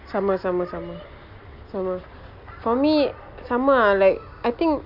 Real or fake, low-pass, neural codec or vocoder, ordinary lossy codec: real; 5.4 kHz; none; none